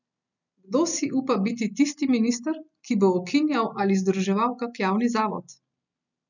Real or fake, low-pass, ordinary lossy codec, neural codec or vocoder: real; 7.2 kHz; none; none